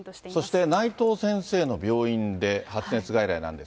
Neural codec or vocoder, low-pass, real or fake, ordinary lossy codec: none; none; real; none